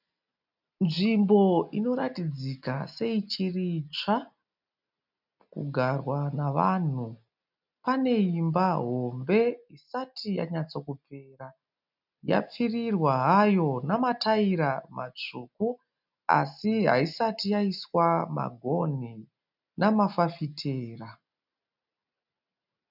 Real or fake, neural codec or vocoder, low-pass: real; none; 5.4 kHz